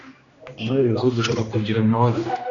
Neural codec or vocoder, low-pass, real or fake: codec, 16 kHz, 1 kbps, X-Codec, HuBERT features, trained on general audio; 7.2 kHz; fake